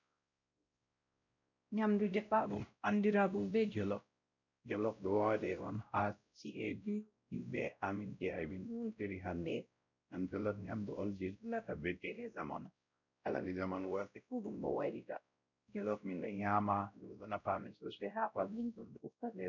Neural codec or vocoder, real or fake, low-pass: codec, 16 kHz, 0.5 kbps, X-Codec, WavLM features, trained on Multilingual LibriSpeech; fake; 7.2 kHz